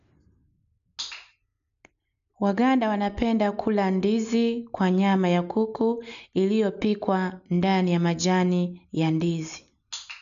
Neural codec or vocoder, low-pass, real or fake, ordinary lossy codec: none; 7.2 kHz; real; none